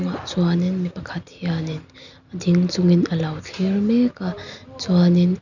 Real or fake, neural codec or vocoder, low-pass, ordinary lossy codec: real; none; 7.2 kHz; none